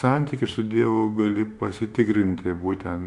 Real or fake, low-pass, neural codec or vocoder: fake; 10.8 kHz; autoencoder, 48 kHz, 32 numbers a frame, DAC-VAE, trained on Japanese speech